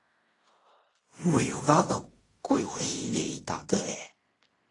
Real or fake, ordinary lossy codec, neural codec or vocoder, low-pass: fake; AAC, 32 kbps; codec, 16 kHz in and 24 kHz out, 0.4 kbps, LongCat-Audio-Codec, fine tuned four codebook decoder; 10.8 kHz